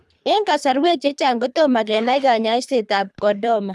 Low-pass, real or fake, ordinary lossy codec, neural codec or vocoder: none; fake; none; codec, 24 kHz, 3 kbps, HILCodec